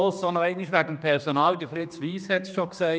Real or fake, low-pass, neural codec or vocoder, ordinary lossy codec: fake; none; codec, 16 kHz, 2 kbps, X-Codec, HuBERT features, trained on general audio; none